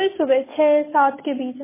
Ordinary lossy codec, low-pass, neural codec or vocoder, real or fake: MP3, 16 kbps; 3.6 kHz; none; real